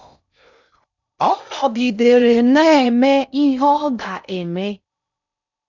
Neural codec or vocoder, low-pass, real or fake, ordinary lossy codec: codec, 16 kHz in and 24 kHz out, 0.6 kbps, FocalCodec, streaming, 4096 codes; 7.2 kHz; fake; none